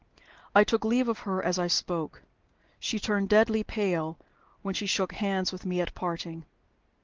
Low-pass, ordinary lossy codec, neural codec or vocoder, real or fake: 7.2 kHz; Opus, 16 kbps; none; real